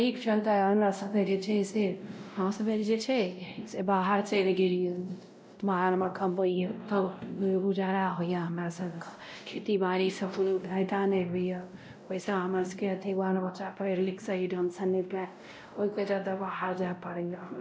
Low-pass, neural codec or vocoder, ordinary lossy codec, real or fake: none; codec, 16 kHz, 0.5 kbps, X-Codec, WavLM features, trained on Multilingual LibriSpeech; none; fake